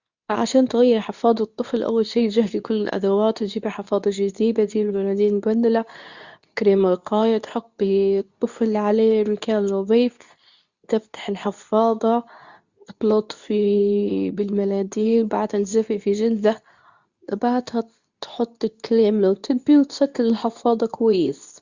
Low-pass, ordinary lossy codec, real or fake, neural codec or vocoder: 7.2 kHz; Opus, 64 kbps; fake; codec, 24 kHz, 0.9 kbps, WavTokenizer, medium speech release version 2